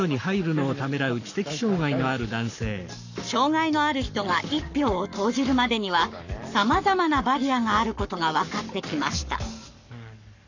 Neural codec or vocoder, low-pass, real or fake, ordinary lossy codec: codec, 44.1 kHz, 7.8 kbps, Pupu-Codec; 7.2 kHz; fake; none